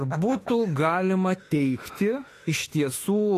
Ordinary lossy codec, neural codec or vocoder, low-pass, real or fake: AAC, 48 kbps; autoencoder, 48 kHz, 32 numbers a frame, DAC-VAE, trained on Japanese speech; 14.4 kHz; fake